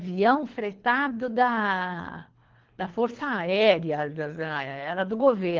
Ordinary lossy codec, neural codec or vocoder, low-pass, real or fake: Opus, 16 kbps; codec, 24 kHz, 3 kbps, HILCodec; 7.2 kHz; fake